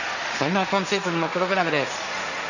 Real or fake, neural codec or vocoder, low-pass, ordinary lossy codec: fake; codec, 16 kHz, 1.1 kbps, Voila-Tokenizer; 7.2 kHz; none